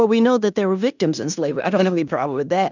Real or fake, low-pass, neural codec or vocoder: fake; 7.2 kHz; codec, 16 kHz in and 24 kHz out, 0.9 kbps, LongCat-Audio-Codec, fine tuned four codebook decoder